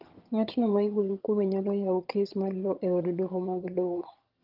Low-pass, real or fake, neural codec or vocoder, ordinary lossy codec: 5.4 kHz; fake; vocoder, 22.05 kHz, 80 mel bands, HiFi-GAN; Opus, 32 kbps